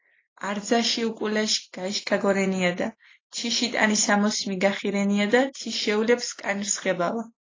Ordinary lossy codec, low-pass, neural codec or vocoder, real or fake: AAC, 32 kbps; 7.2 kHz; none; real